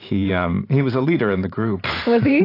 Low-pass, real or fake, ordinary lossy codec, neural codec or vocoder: 5.4 kHz; fake; AAC, 32 kbps; vocoder, 44.1 kHz, 128 mel bands every 512 samples, BigVGAN v2